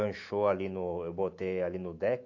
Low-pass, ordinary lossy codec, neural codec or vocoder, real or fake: 7.2 kHz; AAC, 48 kbps; autoencoder, 48 kHz, 128 numbers a frame, DAC-VAE, trained on Japanese speech; fake